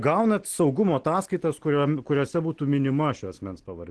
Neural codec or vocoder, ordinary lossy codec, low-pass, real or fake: none; Opus, 16 kbps; 10.8 kHz; real